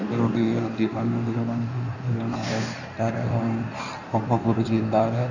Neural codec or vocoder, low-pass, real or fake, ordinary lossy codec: codec, 16 kHz in and 24 kHz out, 1.1 kbps, FireRedTTS-2 codec; 7.2 kHz; fake; none